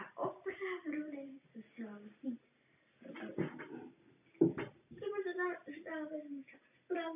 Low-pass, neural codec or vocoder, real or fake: 3.6 kHz; none; real